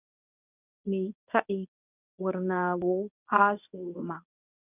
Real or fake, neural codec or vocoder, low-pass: fake; codec, 24 kHz, 0.9 kbps, WavTokenizer, medium speech release version 1; 3.6 kHz